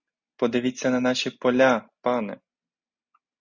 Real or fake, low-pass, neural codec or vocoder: real; 7.2 kHz; none